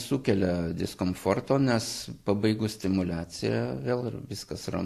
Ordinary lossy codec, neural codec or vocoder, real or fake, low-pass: AAC, 64 kbps; none; real; 14.4 kHz